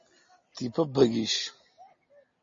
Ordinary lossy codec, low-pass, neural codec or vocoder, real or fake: MP3, 32 kbps; 7.2 kHz; none; real